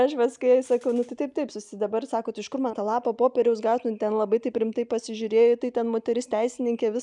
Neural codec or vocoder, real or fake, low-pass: none; real; 10.8 kHz